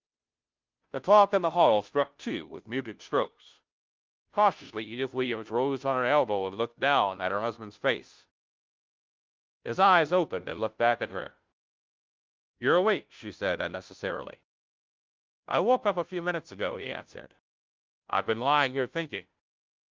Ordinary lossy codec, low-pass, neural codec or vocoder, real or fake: Opus, 24 kbps; 7.2 kHz; codec, 16 kHz, 0.5 kbps, FunCodec, trained on Chinese and English, 25 frames a second; fake